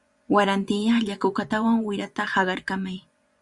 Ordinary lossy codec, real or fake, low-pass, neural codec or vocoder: Opus, 64 kbps; fake; 10.8 kHz; vocoder, 24 kHz, 100 mel bands, Vocos